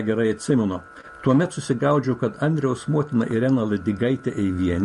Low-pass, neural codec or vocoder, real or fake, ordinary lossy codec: 14.4 kHz; vocoder, 44.1 kHz, 128 mel bands every 512 samples, BigVGAN v2; fake; MP3, 48 kbps